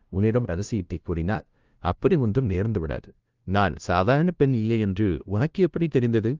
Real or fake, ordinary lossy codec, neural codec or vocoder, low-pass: fake; Opus, 32 kbps; codec, 16 kHz, 0.5 kbps, FunCodec, trained on LibriTTS, 25 frames a second; 7.2 kHz